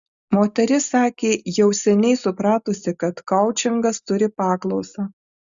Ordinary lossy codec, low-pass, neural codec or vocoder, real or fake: Opus, 64 kbps; 7.2 kHz; none; real